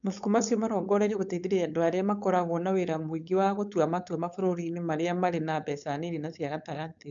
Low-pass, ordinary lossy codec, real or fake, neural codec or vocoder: 7.2 kHz; none; fake; codec, 16 kHz, 4.8 kbps, FACodec